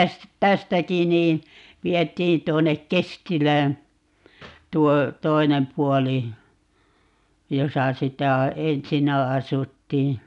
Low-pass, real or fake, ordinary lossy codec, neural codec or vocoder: 10.8 kHz; real; none; none